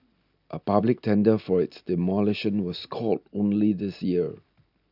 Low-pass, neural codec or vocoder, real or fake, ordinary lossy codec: 5.4 kHz; none; real; none